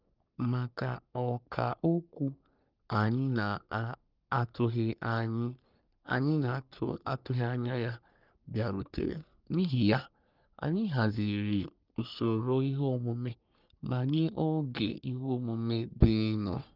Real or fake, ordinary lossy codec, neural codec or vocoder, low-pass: fake; Opus, 24 kbps; codec, 44.1 kHz, 3.4 kbps, Pupu-Codec; 5.4 kHz